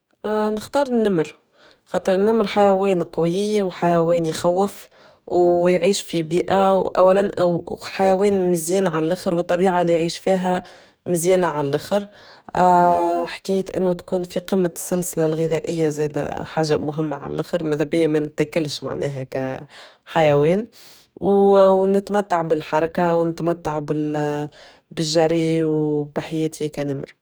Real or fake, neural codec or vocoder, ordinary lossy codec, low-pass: fake; codec, 44.1 kHz, 2.6 kbps, DAC; none; none